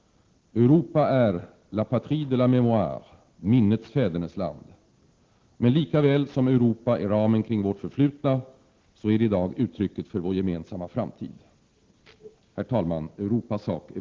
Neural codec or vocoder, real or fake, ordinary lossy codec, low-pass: none; real; Opus, 16 kbps; 7.2 kHz